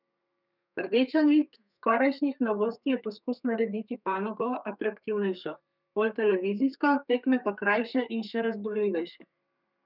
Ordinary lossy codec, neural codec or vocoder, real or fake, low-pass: none; codec, 32 kHz, 1.9 kbps, SNAC; fake; 5.4 kHz